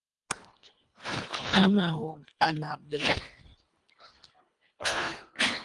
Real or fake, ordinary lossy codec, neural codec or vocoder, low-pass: fake; Opus, 32 kbps; codec, 24 kHz, 1.5 kbps, HILCodec; 10.8 kHz